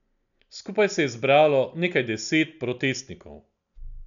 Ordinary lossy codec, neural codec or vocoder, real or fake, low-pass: none; none; real; 7.2 kHz